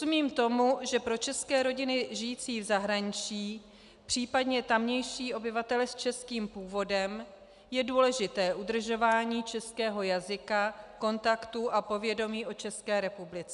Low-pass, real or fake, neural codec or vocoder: 10.8 kHz; real; none